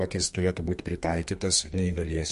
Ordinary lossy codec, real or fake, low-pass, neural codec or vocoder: MP3, 48 kbps; fake; 14.4 kHz; codec, 32 kHz, 1.9 kbps, SNAC